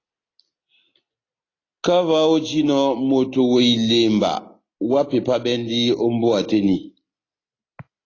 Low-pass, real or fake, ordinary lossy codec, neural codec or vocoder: 7.2 kHz; real; AAC, 32 kbps; none